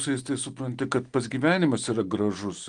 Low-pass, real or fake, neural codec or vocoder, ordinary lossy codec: 9.9 kHz; real; none; Opus, 24 kbps